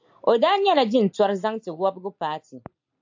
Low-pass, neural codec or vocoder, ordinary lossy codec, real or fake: 7.2 kHz; none; AAC, 48 kbps; real